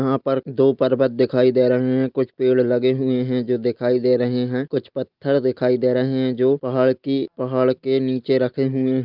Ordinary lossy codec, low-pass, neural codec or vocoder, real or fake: Opus, 32 kbps; 5.4 kHz; none; real